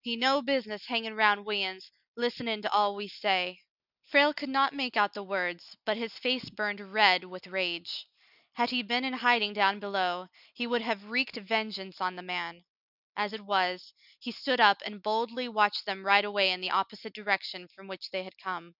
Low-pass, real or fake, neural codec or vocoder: 5.4 kHz; real; none